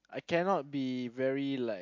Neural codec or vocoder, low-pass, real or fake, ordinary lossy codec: none; 7.2 kHz; real; MP3, 48 kbps